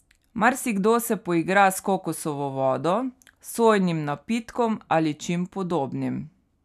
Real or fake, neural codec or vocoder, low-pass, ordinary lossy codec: real; none; 14.4 kHz; none